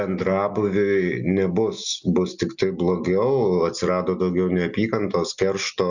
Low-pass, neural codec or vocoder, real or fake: 7.2 kHz; none; real